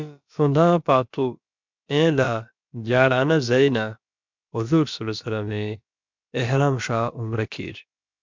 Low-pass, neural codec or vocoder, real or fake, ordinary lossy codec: 7.2 kHz; codec, 16 kHz, about 1 kbps, DyCAST, with the encoder's durations; fake; MP3, 64 kbps